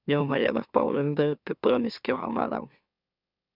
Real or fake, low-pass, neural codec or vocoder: fake; 5.4 kHz; autoencoder, 44.1 kHz, a latent of 192 numbers a frame, MeloTTS